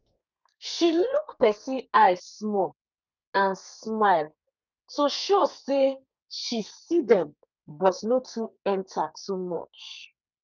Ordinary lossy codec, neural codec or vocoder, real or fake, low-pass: none; codec, 32 kHz, 1.9 kbps, SNAC; fake; 7.2 kHz